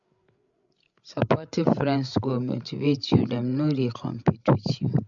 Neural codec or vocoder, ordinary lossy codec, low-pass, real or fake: codec, 16 kHz, 16 kbps, FreqCodec, larger model; MP3, 64 kbps; 7.2 kHz; fake